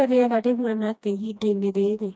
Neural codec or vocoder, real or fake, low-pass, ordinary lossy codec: codec, 16 kHz, 1 kbps, FreqCodec, smaller model; fake; none; none